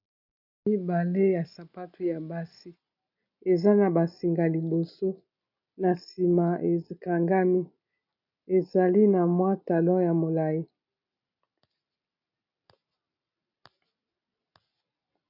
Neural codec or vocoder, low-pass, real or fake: none; 5.4 kHz; real